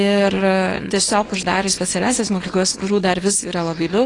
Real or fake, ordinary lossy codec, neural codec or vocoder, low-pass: fake; AAC, 32 kbps; codec, 24 kHz, 0.9 kbps, WavTokenizer, small release; 10.8 kHz